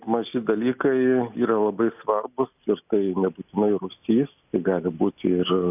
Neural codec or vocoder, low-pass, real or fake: none; 3.6 kHz; real